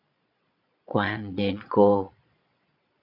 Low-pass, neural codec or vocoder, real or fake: 5.4 kHz; vocoder, 22.05 kHz, 80 mel bands, Vocos; fake